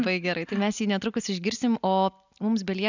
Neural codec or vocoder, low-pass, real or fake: none; 7.2 kHz; real